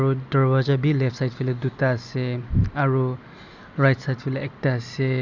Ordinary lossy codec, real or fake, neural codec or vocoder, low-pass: none; real; none; 7.2 kHz